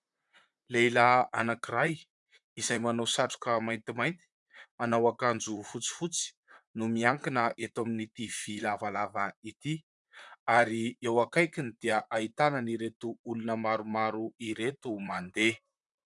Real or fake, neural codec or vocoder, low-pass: fake; vocoder, 44.1 kHz, 128 mel bands, Pupu-Vocoder; 10.8 kHz